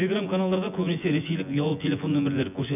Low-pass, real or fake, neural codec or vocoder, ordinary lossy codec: 3.6 kHz; fake; vocoder, 24 kHz, 100 mel bands, Vocos; none